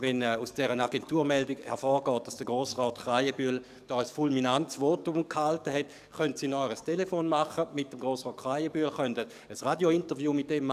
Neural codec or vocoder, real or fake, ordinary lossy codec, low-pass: codec, 44.1 kHz, 7.8 kbps, DAC; fake; none; 14.4 kHz